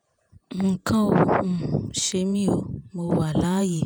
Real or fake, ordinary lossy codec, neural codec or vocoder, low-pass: fake; none; vocoder, 48 kHz, 128 mel bands, Vocos; none